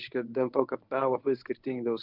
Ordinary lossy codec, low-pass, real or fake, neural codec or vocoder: Opus, 24 kbps; 5.4 kHz; fake; codec, 24 kHz, 0.9 kbps, WavTokenizer, medium speech release version 1